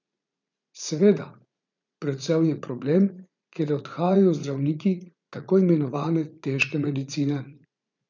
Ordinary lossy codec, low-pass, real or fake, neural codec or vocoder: none; 7.2 kHz; fake; vocoder, 44.1 kHz, 80 mel bands, Vocos